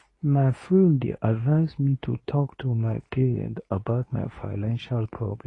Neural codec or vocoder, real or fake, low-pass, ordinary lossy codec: codec, 24 kHz, 0.9 kbps, WavTokenizer, medium speech release version 2; fake; 10.8 kHz; AAC, 32 kbps